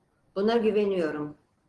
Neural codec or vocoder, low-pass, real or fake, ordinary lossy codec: none; 10.8 kHz; real; Opus, 16 kbps